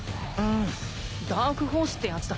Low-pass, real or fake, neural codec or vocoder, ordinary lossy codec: none; real; none; none